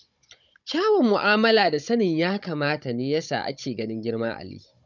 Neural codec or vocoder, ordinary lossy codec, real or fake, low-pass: codec, 16 kHz, 16 kbps, FunCodec, trained on Chinese and English, 50 frames a second; none; fake; 7.2 kHz